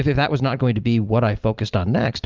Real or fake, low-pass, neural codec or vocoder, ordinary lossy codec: real; 7.2 kHz; none; Opus, 24 kbps